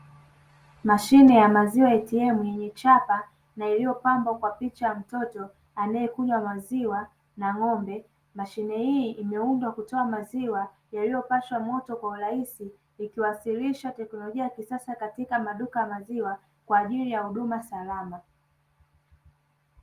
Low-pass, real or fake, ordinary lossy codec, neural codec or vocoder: 14.4 kHz; real; Opus, 24 kbps; none